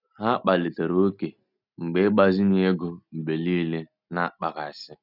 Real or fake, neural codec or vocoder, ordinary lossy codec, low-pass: real; none; none; 5.4 kHz